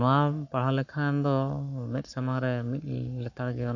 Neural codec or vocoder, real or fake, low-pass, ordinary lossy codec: codec, 44.1 kHz, 7.8 kbps, Pupu-Codec; fake; 7.2 kHz; none